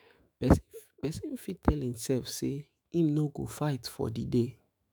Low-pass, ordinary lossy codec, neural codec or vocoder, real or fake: none; none; autoencoder, 48 kHz, 128 numbers a frame, DAC-VAE, trained on Japanese speech; fake